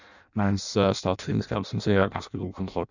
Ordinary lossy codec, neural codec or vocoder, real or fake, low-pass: none; codec, 16 kHz in and 24 kHz out, 0.6 kbps, FireRedTTS-2 codec; fake; 7.2 kHz